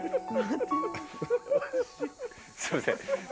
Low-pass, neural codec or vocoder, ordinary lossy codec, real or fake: none; none; none; real